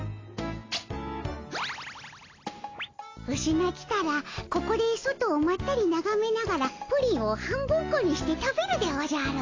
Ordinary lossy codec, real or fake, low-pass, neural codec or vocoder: AAC, 32 kbps; real; 7.2 kHz; none